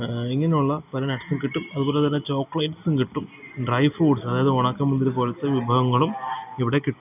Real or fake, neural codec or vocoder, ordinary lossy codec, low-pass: real; none; none; 3.6 kHz